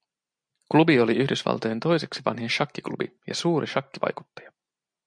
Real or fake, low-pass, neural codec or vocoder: real; 9.9 kHz; none